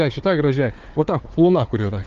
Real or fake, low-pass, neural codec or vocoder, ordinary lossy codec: fake; 7.2 kHz; codec, 16 kHz, 4 kbps, FunCodec, trained on Chinese and English, 50 frames a second; Opus, 24 kbps